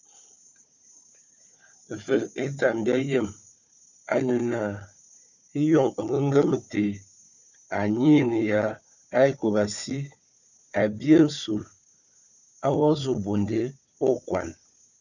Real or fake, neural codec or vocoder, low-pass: fake; codec, 16 kHz, 4 kbps, FunCodec, trained on Chinese and English, 50 frames a second; 7.2 kHz